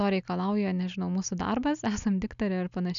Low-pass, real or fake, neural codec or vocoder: 7.2 kHz; real; none